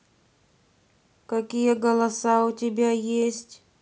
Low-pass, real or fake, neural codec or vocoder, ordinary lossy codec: none; real; none; none